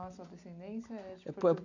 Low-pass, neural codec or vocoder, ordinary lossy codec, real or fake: 7.2 kHz; none; none; real